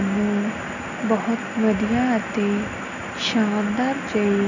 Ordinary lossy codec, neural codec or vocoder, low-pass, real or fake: none; none; 7.2 kHz; real